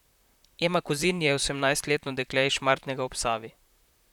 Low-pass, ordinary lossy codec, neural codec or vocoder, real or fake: 19.8 kHz; none; vocoder, 44.1 kHz, 128 mel bands, Pupu-Vocoder; fake